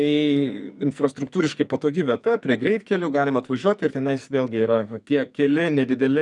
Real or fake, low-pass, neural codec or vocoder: fake; 10.8 kHz; codec, 44.1 kHz, 2.6 kbps, SNAC